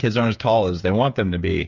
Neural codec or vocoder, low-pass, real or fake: codec, 16 kHz, 8 kbps, FreqCodec, smaller model; 7.2 kHz; fake